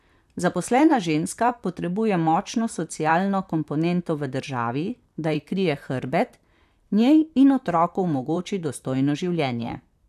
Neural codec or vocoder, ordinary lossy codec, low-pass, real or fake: vocoder, 44.1 kHz, 128 mel bands, Pupu-Vocoder; none; 14.4 kHz; fake